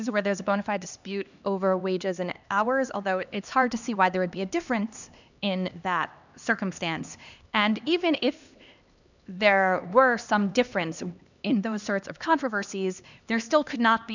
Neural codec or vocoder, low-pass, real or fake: codec, 16 kHz, 2 kbps, X-Codec, HuBERT features, trained on LibriSpeech; 7.2 kHz; fake